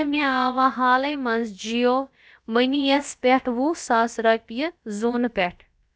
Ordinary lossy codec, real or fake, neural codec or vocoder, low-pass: none; fake; codec, 16 kHz, about 1 kbps, DyCAST, with the encoder's durations; none